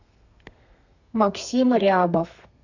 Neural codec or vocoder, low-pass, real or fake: codec, 32 kHz, 1.9 kbps, SNAC; 7.2 kHz; fake